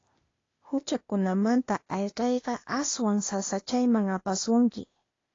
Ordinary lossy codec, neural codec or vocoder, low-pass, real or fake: AAC, 32 kbps; codec, 16 kHz, 0.8 kbps, ZipCodec; 7.2 kHz; fake